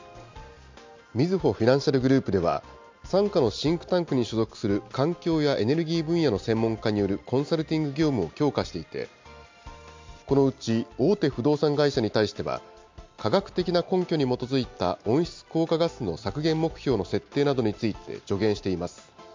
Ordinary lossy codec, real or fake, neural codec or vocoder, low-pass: MP3, 48 kbps; real; none; 7.2 kHz